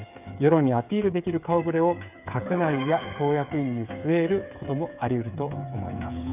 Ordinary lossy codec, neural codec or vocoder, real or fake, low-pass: none; codec, 16 kHz, 8 kbps, FreqCodec, smaller model; fake; 3.6 kHz